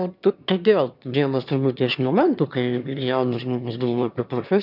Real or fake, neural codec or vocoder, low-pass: fake; autoencoder, 22.05 kHz, a latent of 192 numbers a frame, VITS, trained on one speaker; 5.4 kHz